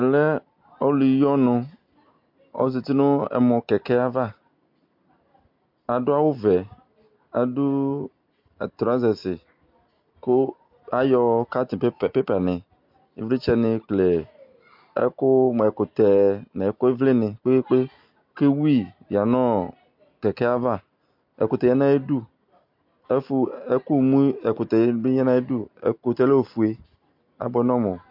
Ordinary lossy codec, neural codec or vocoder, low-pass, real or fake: MP3, 48 kbps; none; 5.4 kHz; real